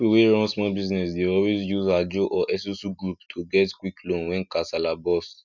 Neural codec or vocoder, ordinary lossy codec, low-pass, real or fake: none; none; 7.2 kHz; real